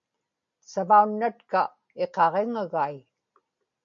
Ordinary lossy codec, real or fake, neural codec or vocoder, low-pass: MP3, 64 kbps; real; none; 7.2 kHz